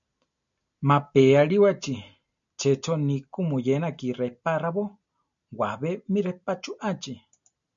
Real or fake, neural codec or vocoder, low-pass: real; none; 7.2 kHz